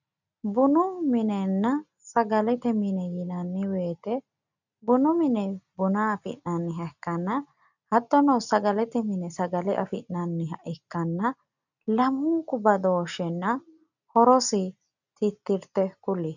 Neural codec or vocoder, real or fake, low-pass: none; real; 7.2 kHz